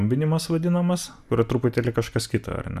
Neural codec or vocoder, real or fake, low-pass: none; real; 14.4 kHz